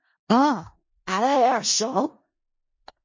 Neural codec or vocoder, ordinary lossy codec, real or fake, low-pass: codec, 16 kHz in and 24 kHz out, 0.4 kbps, LongCat-Audio-Codec, four codebook decoder; MP3, 32 kbps; fake; 7.2 kHz